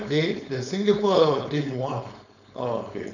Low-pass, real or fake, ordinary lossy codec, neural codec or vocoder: 7.2 kHz; fake; none; codec, 16 kHz, 4.8 kbps, FACodec